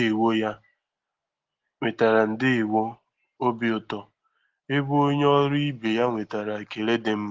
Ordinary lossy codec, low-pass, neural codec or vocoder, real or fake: Opus, 16 kbps; 7.2 kHz; none; real